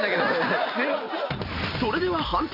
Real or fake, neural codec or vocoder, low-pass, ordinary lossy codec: real; none; 5.4 kHz; none